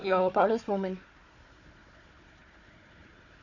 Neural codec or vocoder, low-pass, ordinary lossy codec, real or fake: codec, 16 kHz, 4 kbps, FunCodec, trained on Chinese and English, 50 frames a second; 7.2 kHz; none; fake